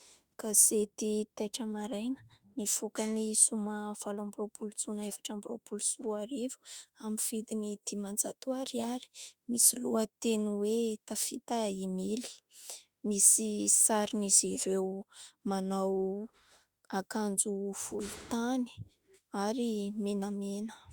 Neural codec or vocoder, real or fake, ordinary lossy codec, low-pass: autoencoder, 48 kHz, 32 numbers a frame, DAC-VAE, trained on Japanese speech; fake; Opus, 64 kbps; 19.8 kHz